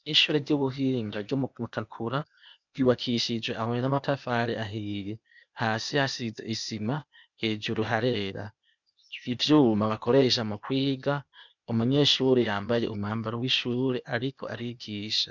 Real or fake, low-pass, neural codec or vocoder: fake; 7.2 kHz; codec, 16 kHz, 0.8 kbps, ZipCodec